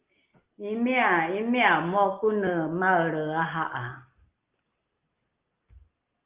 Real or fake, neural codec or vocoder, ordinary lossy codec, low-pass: real; none; Opus, 32 kbps; 3.6 kHz